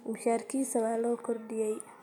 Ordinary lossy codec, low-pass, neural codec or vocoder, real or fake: none; 19.8 kHz; none; real